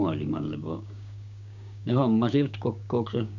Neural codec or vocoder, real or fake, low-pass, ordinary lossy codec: codec, 16 kHz, 6 kbps, DAC; fake; 7.2 kHz; none